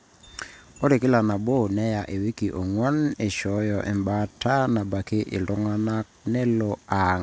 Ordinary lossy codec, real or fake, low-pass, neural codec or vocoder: none; real; none; none